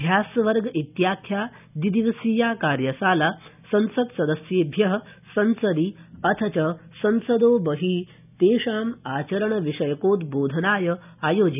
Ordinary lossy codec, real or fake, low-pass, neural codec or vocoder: none; real; 3.6 kHz; none